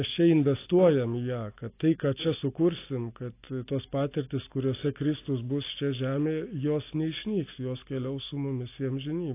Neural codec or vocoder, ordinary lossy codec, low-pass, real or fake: none; AAC, 24 kbps; 3.6 kHz; real